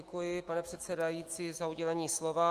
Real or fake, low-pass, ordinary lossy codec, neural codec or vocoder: fake; 14.4 kHz; Opus, 24 kbps; autoencoder, 48 kHz, 128 numbers a frame, DAC-VAE, trained on Japanese speech